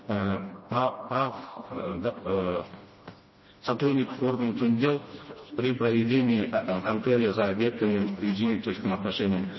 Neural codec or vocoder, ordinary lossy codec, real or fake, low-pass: codec, 16 kHz, 1 kbps, FreqCodec, smaller model; MP3, 24 kbps; fake; 7.2 kHz